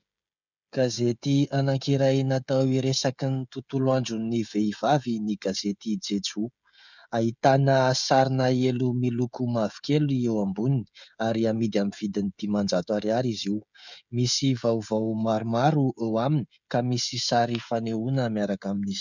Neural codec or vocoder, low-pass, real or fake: codec, 16 kHz, 16 kbps, FreqCodec, smaller model; 7.2 kHz; fake